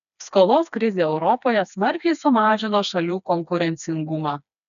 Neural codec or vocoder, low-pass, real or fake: codec, 16 kHz, 2 kbps, FreqCodec, smaller model; 7.2 kHz; fake